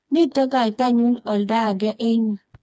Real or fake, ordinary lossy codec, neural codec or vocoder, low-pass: fake; none; codec, 16 kHz, 2 kbps, FreqCodec, smaller model; none